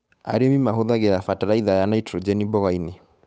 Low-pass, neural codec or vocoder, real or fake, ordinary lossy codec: none; codec, 16 kHz, 8 kbps, FunCodec, trained on Chinese and English, 25 frames a second; fake; none